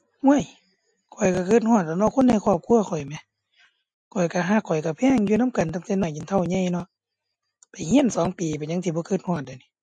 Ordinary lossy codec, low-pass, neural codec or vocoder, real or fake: MP3, 48 kbps; 9.9 kHz; none; real